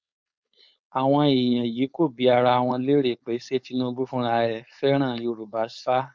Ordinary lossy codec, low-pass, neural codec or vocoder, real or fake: none; none; codec, 16 kHz, 4.8 kbps, FACodec; fake